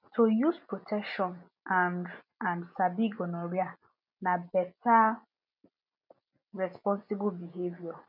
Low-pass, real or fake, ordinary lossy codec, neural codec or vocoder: 5.4 kHz; real; none; none